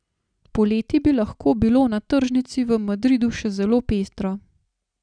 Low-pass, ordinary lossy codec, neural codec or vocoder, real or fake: 9.9 kHz; none; none; real